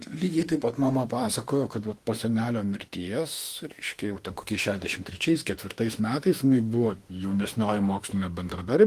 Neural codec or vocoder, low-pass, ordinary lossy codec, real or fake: autoencoder, 48 kHz, 32 numbers a frame, DAC-VAE, trained on Japanese speech; 14.4 kHz; Opus, 24 kbps; fake